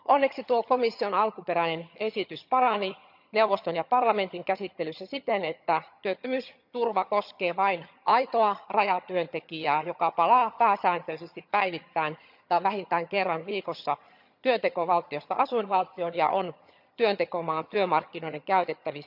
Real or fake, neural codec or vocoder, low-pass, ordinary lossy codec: fake; vocoder, 22.05 kHz, 80 mel bands, HiFi-GAN; 5.4 kHz; none